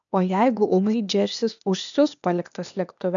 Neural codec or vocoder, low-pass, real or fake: codec, 16 kHz, 0.8 kbps, ZipCodec; 7.2 kHz; fake